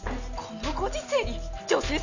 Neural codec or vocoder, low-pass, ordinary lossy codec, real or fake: none; 7.2 kHz; none; real